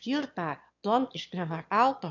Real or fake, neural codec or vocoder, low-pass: fake; autoencoder, 22.05 kHz, a latent of 192 numbers a frame, VITS, trained on one speaker; 7.2 kHz